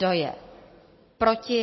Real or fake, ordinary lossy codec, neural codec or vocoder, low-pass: real; MP3, 24 kbps; none; 7.2 kHz